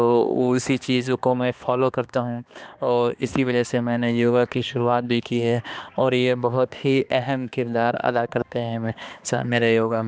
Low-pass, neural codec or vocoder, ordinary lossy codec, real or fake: none; codec, 16 kHz, 2 kbps, X-Codec, HuBERT features, trained on balanced general audio; none; fake